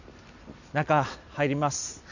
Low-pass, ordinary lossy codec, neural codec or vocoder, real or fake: 7.2 kHz; none; none; real